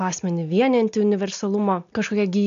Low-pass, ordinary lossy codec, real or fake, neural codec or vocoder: 7.2 kHz; MP3, 64 kbps; real; none